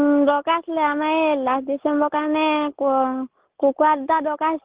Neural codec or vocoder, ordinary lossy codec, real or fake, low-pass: none; Opus, 16 kbps; real; 3.6 kHz